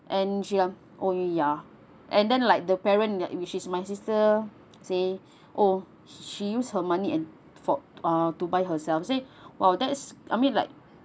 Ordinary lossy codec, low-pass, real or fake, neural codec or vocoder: none; none; real; none